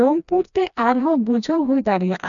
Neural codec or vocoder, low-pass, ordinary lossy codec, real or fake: codec, 16 kHz, 1 kbps, FreqCodec, smaller model; 7.2 kHz; none; fake